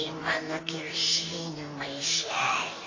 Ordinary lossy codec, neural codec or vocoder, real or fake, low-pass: AAC, 32 kbps; codec, 44.1 kHz, 2.6 kbps, DAC; fake; 7.2 kHz